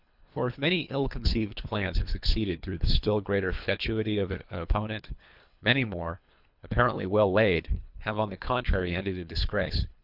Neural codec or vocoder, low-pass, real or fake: codec, 24 kHz, 3 kbps, HILCodec; 5.4 kHz; fake